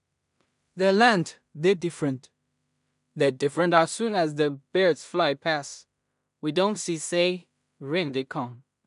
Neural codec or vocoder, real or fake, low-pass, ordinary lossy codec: codec, 16 kHz in and 24 kHz out, 0.4 kbps, LongCat-Audio-Codec, two codebook decoder; fake; 10.8 kHz; none